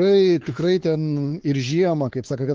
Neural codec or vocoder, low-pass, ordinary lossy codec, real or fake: codec, 16 kHz, 4 kbps, X-Codec, WavLM features, trained on Multilingual LibriSpeech; 7.2 kHz; Opus, 16 kbps; fake